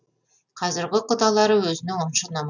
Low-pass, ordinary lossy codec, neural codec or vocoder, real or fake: 7.2 kHz; none; none; real